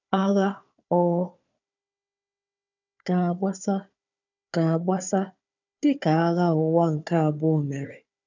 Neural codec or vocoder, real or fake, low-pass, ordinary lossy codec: codec, 16 kHz, 4 kbps, FunCodec, trained on Chinese and English, 50 frames a second; fake; 7.2 kHz; none